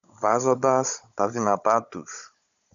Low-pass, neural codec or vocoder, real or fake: 7.2 kHz; codec, 16 kHz, 16 kbps, FunCodec, trained on Chinese and English, 50 frames a second; fake